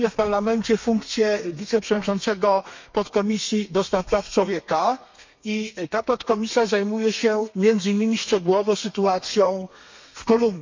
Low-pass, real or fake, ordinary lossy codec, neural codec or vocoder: 7.2 kHz; fake; MP3, 64 kbps; codec, 32 kHz, 1.9 kbps, SNAC